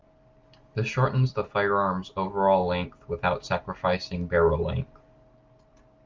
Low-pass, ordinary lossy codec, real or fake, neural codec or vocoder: 7.2 kHz; Opus, 32 kbps; real; none